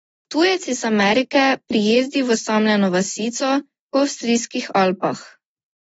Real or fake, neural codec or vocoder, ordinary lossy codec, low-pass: real; none; AAC, 24 kbps; 19.8 kHz